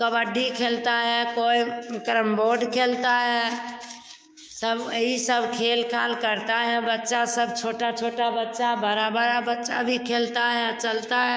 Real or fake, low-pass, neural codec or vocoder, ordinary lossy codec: fake; none; codec, 16 kHz, 6 kbps, DAC; none